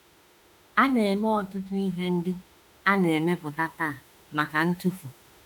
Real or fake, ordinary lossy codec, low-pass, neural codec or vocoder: fake; none; 19.8 kHz; autoencoder, 48 kHz, 32 numbers a frame, DAC-VAE, trained on Japanese speech